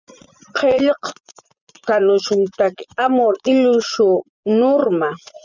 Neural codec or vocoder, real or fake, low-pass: vocoder, 24 kHz, 100 mel bands, Vocos; fake; 7.2 kHz